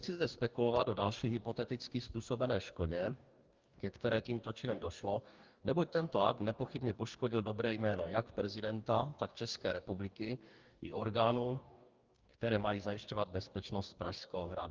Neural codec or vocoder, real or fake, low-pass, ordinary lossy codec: codec, 44.1 kHz, 2.6 kbps, DAC; fake; 7.2 kHz; Opus, 32 kbps